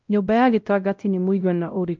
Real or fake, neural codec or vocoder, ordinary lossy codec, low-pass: fake; codec, 16 kHz, 0.5 kbps, X-Codec, WavLM features, trained on Multilingual LibriSpeech; Opus, 24 kbps; 7.2 kHz